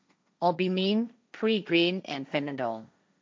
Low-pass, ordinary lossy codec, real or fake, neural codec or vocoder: none; none; fake; codec, 16 kHz, 1.1 kbps, Voila-Tokenizer